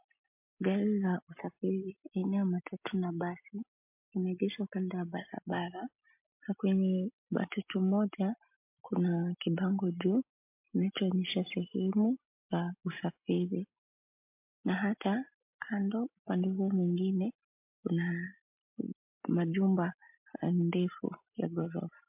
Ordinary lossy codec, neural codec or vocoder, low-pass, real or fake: MP3, 32 kbps; none; 3.6 kHz; real